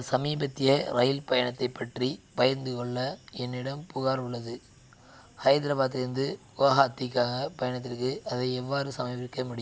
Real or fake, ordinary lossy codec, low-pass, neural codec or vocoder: real; none; none; none